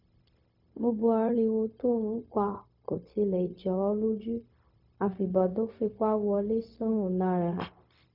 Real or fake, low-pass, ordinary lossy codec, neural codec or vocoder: fake; 5.4 kHz; none; codec, 16 kHz, 0.4 kbps, LongCat-Audio-Codec